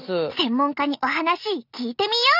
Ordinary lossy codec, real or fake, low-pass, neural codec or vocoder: none; real; 5.4 kHz; none